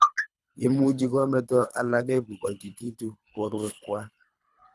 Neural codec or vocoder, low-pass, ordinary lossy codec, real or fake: codec, 24 kHz, 3 kbps, HILCodec; none; none; fake